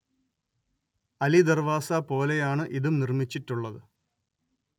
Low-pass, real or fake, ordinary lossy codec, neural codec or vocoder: 19.8 kHz; real; none; none